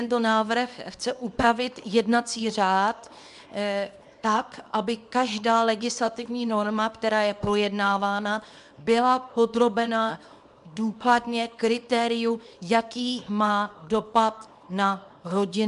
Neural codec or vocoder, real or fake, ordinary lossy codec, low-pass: codec, 24 kHz, 0.9 kbps, WavTokenizer, small release; fake; MP3, 96 kbps; 10.8 kHz